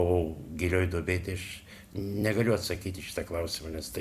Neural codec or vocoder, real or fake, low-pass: vocoder, 44.1 kHz, 128 mel bands every 256 samples, BigVGAN v2; fake; 14.4 kHz